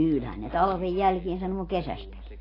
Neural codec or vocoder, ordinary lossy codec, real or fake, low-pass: none; AAC, 24 kbps; real; 5.4 kHz